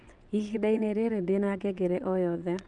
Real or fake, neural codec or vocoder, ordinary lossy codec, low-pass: fake; vocoder, 22.05 kHz, 80 mel bands, WaveNeXt; none; 9.9 kHz